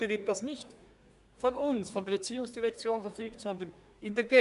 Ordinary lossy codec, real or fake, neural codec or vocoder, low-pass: none; fake; codec, 24 kHz, 1 kbps, SNAC; 10.8 kHz